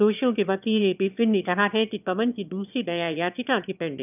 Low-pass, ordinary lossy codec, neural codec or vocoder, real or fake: 3.6 kHz; none; autoencoder, 22.05 kHz, a latent of 192 numbers a frame, VITS, trained on one speaker; fake